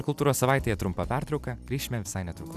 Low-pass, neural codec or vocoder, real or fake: 14.4 kHz; none; real